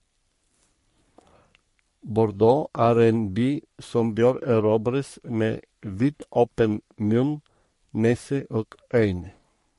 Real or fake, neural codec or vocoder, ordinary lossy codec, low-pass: fake; codec, 44.1 kHz, 3.4 kbps, Pupu-Codec; MP3, 48 kbps; 14.4 kHz